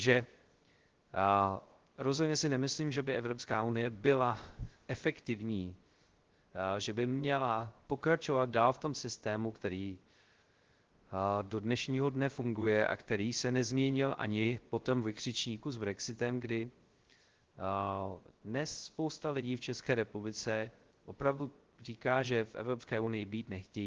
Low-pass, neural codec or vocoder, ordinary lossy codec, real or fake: 7.2 kHz; codec, 16 kHz, 0.3 kbps, FocalCodec; Opus, 16 kbps; fake